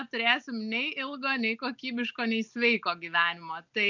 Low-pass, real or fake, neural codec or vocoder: 7.2 kHz; real; none